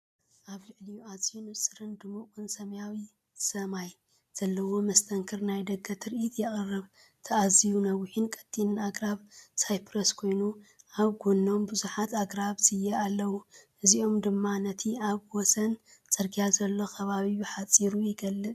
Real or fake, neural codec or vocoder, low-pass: real; none; 14.4 kHz